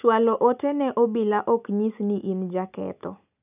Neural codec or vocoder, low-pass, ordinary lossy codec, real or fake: none; 3.6 kHz; none; real